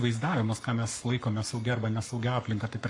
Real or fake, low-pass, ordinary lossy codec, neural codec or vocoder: fake; 10.8 kHz; MP3, 64 kbps; codec, 44.1 kHz, 7.8 kbps, Pupu-Codec